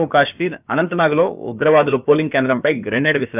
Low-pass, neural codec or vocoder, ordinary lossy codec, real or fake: 3.6 kHz; codec, 16 kHz, about 1 kbps, DyCAST, with the encoder's durations; none; fake